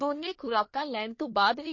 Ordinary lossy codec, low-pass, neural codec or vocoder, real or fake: MP3, 32 kbps; 7.2 kHz; codec, 24 kHz, 1 kbps, SNAC; fake